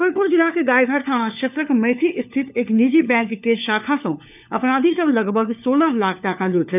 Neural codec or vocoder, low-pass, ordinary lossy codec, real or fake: codec, 16 kHz, 4 kbps, FunCodec, trained on LibriTTS, 50 frames a second; 3.6 kHz; none; fake